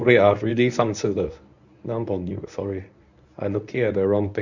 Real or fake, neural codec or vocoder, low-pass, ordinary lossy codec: fake; codec, 24 kHz, 0.9 kbps, WavTokenizer, medium speech release version 1; 7.2 kHz; none